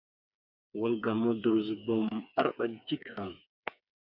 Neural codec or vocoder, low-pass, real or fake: codec, 16 kHz, 4 kbps, FreqCodec, smaller model; 5.4 kHz; fake